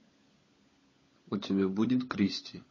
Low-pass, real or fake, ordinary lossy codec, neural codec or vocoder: 7.2 kHz; fake; MP3, 32 kbps; codec, 16 kHz, 16 kbps, FunCodec, trained on LibriTTS, 50 frames a second